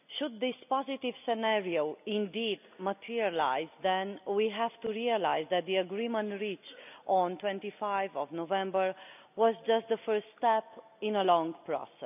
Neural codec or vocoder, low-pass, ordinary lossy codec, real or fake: none; 3.6 kHz; none; real